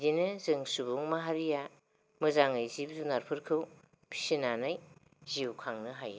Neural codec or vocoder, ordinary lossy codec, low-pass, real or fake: none; none; none; real